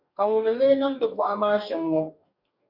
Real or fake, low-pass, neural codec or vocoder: fake; 5.4 kHz; codec, 44.1 kHz, 2.6 kbps, DAC